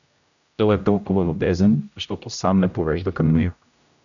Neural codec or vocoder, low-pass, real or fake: codec, 16 kHz, 0.5 kbps, X-Codec, HuBERT features, trained on general audio; 7.2 kHz; fake